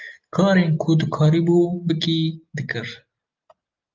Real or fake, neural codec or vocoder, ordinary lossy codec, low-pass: real; none; Opus, 24 kbps; 7.2 kHz